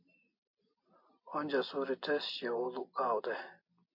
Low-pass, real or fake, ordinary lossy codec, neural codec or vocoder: 5.4 kHz; real; MP3, 32 kbps; none